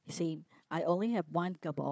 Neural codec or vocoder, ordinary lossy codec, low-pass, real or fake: codec, 16 kHz, 4 kbps, FunCodec, trained on Chinese and English, 50 frames a second; none; none; fake